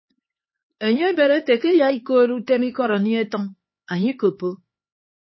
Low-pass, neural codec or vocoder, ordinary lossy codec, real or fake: 7.2 kHz; codec, 16 kHz, 4 kbps, X-Codec, HuBERT features, trained on LibriSpeech; MP3, 24 kbps; fake